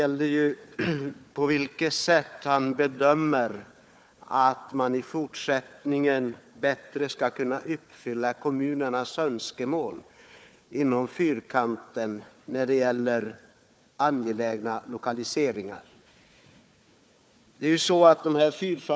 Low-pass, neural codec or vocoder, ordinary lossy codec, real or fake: none; codec, 16 kHz, 4 kbps, FunCodec, trained on Chinese and English, 50 frames a second; none; fake